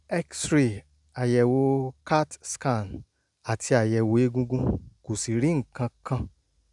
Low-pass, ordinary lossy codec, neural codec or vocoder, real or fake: 10.8 kHz; none; none; real